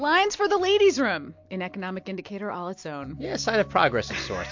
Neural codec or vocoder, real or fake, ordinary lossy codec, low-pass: none; real; MP3, 48 kbps; 7.2 kHz